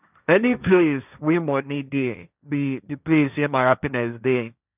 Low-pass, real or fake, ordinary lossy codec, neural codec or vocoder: 3.6 kHz; fake; none; codec, 16 kHz, 1.1 kbps, Voila-Tokenizer